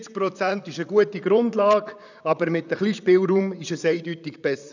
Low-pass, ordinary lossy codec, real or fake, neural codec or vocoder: 7.2 kHz; none; fake; vocoder, 44.1 kHz, 128 mel bands, Pupu-Vocoder